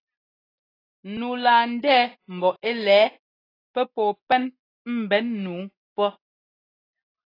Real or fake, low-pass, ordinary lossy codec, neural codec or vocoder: real; 5.4 kHz; AAC, 24 kbps; none